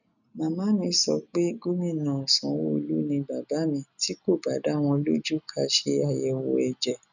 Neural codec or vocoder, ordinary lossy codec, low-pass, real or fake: none; none; 7.2 kHz; real